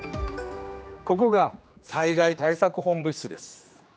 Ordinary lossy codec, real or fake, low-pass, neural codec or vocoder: none; fake; none; codec, 16 kHz, 2 kbps, X-Codec, HuBERT features, trained on general audio